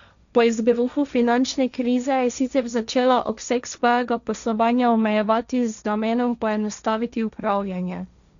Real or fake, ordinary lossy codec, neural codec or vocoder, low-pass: fake; MP3, 96 kbps; codec, 16 kHz, 1.1 kbps, Voila-Tokenizer; 7.2 kHz